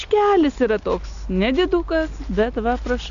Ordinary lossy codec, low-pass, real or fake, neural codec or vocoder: MP3, 96 kbps; 7.2 kHz; real; none